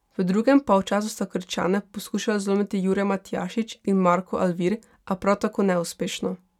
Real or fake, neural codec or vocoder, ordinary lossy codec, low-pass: real; none; none; 19.8 kHz